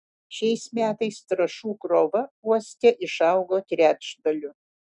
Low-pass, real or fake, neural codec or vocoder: 10.8 kHz; real; none